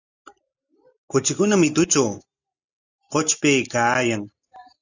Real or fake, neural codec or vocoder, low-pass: real; none; 7.2 kHz